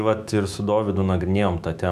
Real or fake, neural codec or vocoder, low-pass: fake; autoencoder, 48 kHz, 128 numbers a frame, DAC-VAE, trained on Japanese speech; 14.4 kHz